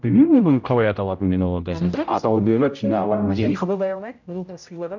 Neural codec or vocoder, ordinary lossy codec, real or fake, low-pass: codec, 16 kHz, 0.5 kbps, X-Codec, HuBERT features, trained on general audio; none; fake; 7.2 kHz